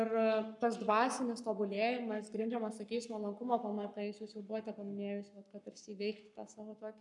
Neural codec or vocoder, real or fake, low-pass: codec, 44.1 kHz, 7.8 kbps, Pupu-Codec; fake; 10.8 kHz